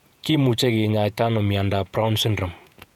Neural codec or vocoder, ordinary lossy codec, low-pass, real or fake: vocoder, 48 kHz, 128 mel bands, Vocos; none; 19.8 kHz; fake